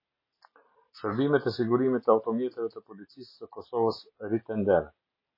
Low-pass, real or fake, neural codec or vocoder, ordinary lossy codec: 5.4 kHz; real; none; MP3, 24 kbps